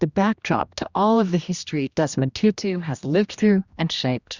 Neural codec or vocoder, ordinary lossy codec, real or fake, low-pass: codec, 16 kHz, 1 kbps, X-Codec, HuBERT features, trained on general audio; Opus, 64 kbps; fake; 7.2 kHz